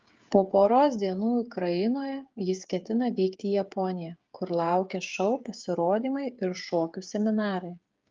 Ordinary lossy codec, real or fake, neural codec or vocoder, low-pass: Opus, 32 kbps; fake; codec, 16 kHz, 8 kbps, FreqCodec, smaller model; 7.2 kHz